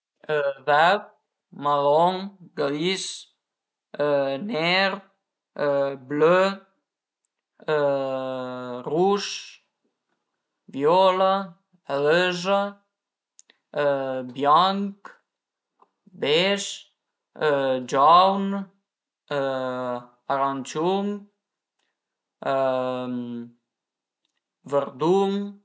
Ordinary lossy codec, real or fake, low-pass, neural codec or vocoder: none; real; none; none